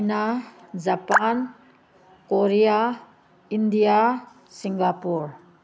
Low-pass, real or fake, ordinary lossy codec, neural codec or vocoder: none; real; none; none